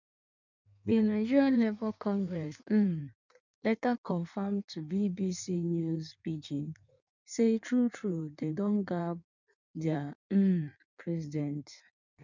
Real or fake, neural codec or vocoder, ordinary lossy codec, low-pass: fake; codec, 16 kHz in and 24 kHz out, 1.1 kbps, FireRedTTS-2 codec; none; 7.2 kHz